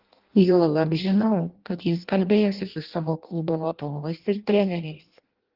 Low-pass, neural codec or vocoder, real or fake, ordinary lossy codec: 5.4 kHz; codec, 16 kHz in and 24 kHz out, 0.6 kbps, FireRedTTS-2 codec; fake; Opus, 16 kbps